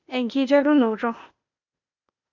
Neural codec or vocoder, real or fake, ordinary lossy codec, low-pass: codec, 16 kHz, 0.8 kbps, ZipCodec; fake; none; 7.2 kHz